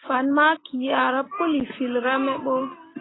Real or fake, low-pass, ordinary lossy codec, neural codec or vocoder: real; 7.2 kHz; AAC, 16 kbps; none